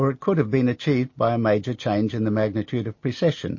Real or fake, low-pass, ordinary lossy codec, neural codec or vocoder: real; 7.2 kHz; MP3, 32 kbps; none